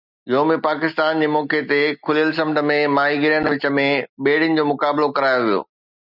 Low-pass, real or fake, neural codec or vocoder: 5.4 kHz; real; none